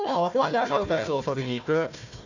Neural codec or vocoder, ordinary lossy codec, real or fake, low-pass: codec, 16 kHz, 1 kbps, FunCodec, trained on Chinese and English, 50 frames a second; none; fake; 7.2 kHz